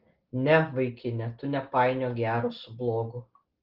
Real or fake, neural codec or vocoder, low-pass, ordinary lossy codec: real; none; 5.4 kHz; Opus, 16 kbps